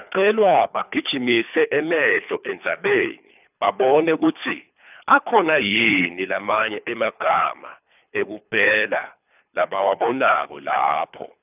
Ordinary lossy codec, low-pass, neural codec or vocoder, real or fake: none; 3.6 kHz; codec, 24 kHz, 3 kbps, HILCodec; fake